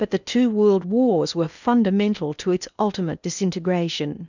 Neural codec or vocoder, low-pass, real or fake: codec, 16 kHz in and 24 kHz out, 0.8 kbps, FocalCodec, streaming, 65536 codes; 7.2 kHz; fake